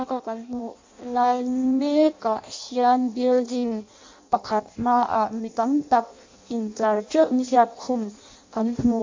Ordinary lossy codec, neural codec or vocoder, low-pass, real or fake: MP3, 48 kbps; codec, 16 kHz in and 24 kHz out, 0.6 kbps, FireRedTTS-2 codec; 7.2 kHz; fake